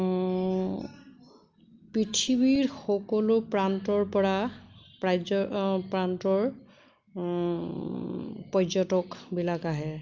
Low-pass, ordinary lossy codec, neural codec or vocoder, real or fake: 7.2 kHz; Opus, 24 kbps; none; real